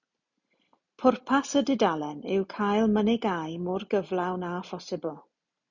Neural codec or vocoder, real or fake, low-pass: none; real; 7.2 kHz